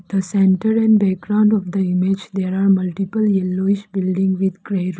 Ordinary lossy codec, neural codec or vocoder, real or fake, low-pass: none; none; real; none